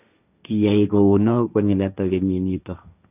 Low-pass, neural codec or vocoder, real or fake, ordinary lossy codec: 3.6 kHz; codec, 16 kHz, 1.1 kbps, Voila-Tokenizer; fake; none